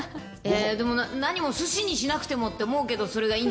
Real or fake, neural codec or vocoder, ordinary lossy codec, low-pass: real; none; none; none